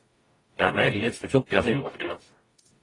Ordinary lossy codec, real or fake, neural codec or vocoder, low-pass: AAC, 32 kbps; fake; codec, 44.1 kHz, 0.9 kbps, DAC; 10.8 kHz